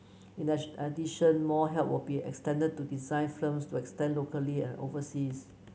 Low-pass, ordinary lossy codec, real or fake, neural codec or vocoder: none; none; real; none